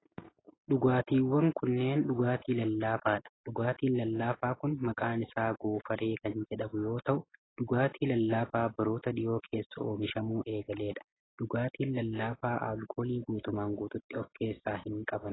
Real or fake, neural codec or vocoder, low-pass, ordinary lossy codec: real; none; 7.2 kHz; AAC, 16 kbps